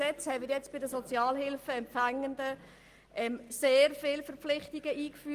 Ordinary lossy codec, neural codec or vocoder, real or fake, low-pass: Opus, 24 kbps; none; real; 14.4 kHz